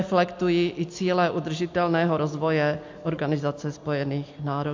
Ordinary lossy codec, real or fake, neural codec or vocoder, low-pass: MP3, 48 kbps; real; none; 7.2 kHz